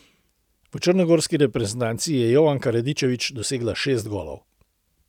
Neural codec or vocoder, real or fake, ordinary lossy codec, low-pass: none; real; none; 19.8 kHz